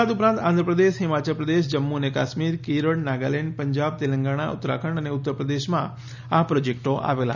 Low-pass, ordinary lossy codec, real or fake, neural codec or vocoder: 7.2 kHz; none; real; none